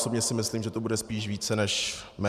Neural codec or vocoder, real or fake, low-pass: vocoder, 44.1 kHz, 128 mel bands every 512 samples, BigVGAN v2; fake; 14.4 kHz